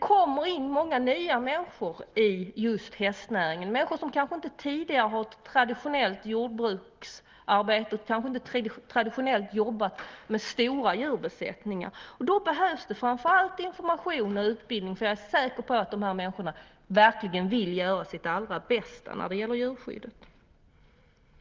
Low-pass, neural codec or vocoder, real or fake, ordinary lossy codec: 7.2 kHz; none; real; Opus, 32 kbps